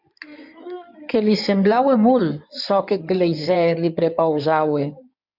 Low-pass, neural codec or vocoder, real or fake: 5.4 kHz; codec, 16 kHz in and 24 kHz out, 2.2 kbps, FireRedTTS-2 codec; fake